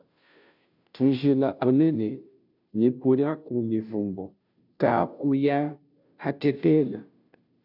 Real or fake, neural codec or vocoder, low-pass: fake; codec, 16 kHz, 0.5 kbps, FunCodec, trained on Chinese and English, 25 frames a second; 5.4 kHz